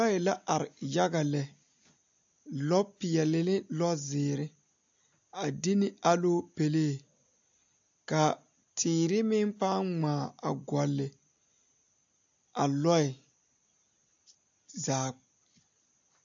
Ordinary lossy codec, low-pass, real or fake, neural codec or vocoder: AAC, 64 kbps; 7.2 kHz; real; none